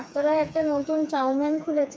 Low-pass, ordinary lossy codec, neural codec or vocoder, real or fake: none; none; codec, 16 kHz, 4 kbps, FreqCodec, smaller model; fake